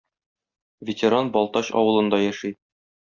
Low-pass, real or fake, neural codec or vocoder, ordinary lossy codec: 7.2 kHz; real; none; Opus, 64 kbps